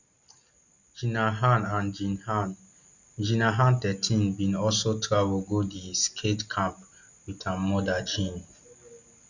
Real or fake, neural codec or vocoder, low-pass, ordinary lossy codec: real; none; 7.2 kHz; none